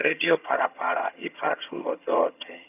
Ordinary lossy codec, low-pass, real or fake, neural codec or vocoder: none; 3.6 kHz; fake; vocoder, 22.05 kHz, 80 mel bands, HiFi-GAN